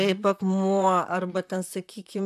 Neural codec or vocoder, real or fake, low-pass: vocoder, 44.1 kHz, 128 mel bands, Pupu-Vocoder; fake; 14.4 kHz